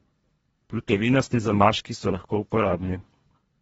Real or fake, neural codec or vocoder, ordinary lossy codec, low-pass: fake; codec, 24 kHz, 1.5 kbps, HILCodec; AAC, 24 kbps; 10.8 kHz